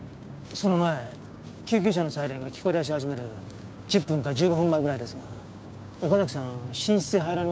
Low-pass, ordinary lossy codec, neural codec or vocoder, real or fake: none; none; codec, 16 kHz, 6 kbps, DAC; fake